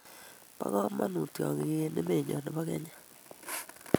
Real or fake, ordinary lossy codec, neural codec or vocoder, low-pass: real; none; none; none